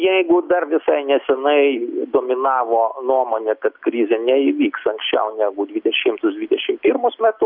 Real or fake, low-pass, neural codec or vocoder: real; 5.4 kHz; none